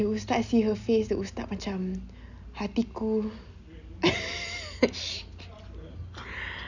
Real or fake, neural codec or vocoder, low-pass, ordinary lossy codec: real; none; 7.2 kHz; none